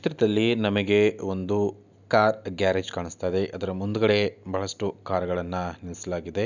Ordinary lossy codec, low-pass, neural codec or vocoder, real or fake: none; 7.2 kHz; none; real